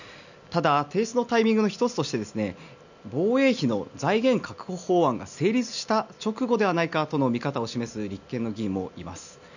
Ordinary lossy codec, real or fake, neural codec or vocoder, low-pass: none; real; none; 7.2 kHz